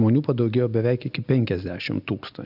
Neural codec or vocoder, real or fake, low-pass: none; real; 5.4 kHz